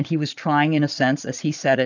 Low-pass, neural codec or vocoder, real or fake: 7.2 kHz; vocoder, 22.05 kHz, 80 mel bands, Vocos; fake